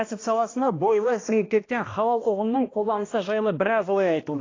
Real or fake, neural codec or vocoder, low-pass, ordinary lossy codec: fake; codec, 16 kHz, 1 kbps, X-Codec, HuBERT features, trained on balanced general audio; 7.2 kHz; AAC, 32 kbps